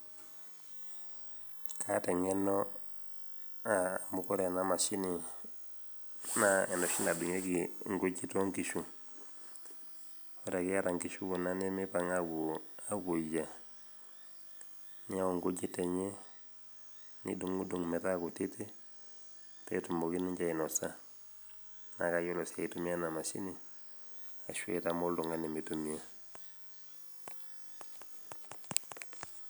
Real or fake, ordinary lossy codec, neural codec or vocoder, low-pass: real; none; none; none